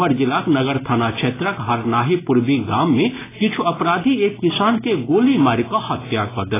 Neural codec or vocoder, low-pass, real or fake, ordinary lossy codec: none; 3.6 kHz; real; AAC, 16 kbps